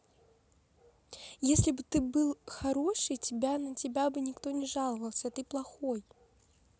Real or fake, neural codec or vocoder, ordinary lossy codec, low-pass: real; none; none; none